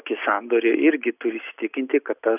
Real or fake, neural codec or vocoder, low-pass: real; none; 3.6 kHz